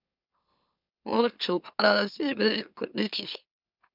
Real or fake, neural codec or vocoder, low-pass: fake; autoencoder, 44.1 kHz, a latent of 192 numbers a frame, MeloTTS; 5.4 kHz